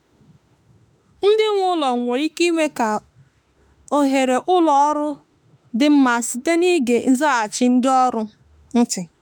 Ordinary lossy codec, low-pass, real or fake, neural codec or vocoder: none; none; fake; autoencoder, 48 kHz, 32 numbers a frame, DAC-VAE, trained on Japanese speech